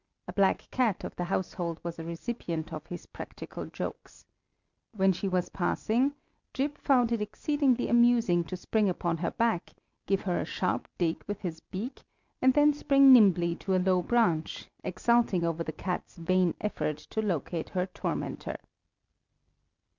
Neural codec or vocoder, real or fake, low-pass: none; real; 7.2 kHz